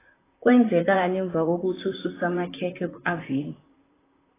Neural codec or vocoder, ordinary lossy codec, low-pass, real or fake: vocoder, 44.1 kHz, 128 mel bands, Pupu-Vocoder; AAC, 16 kbps; 3.6 kHz; fake